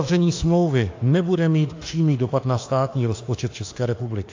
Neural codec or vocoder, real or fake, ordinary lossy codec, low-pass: autoencoder, 48 kHz, 32 numbers a frame, DAC-VAE, trained on Japanese speech; fake; AAC, 48 kbps; 7.2 kHz